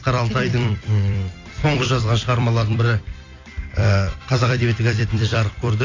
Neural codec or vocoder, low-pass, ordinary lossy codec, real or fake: vocoder, 44.1 kHz, 128 mel bands every 256 samples, BigVGAN v2; 7.2 kHz; AAC, 32 kbps; fake